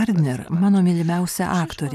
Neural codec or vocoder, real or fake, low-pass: none; real; 14.4 kHz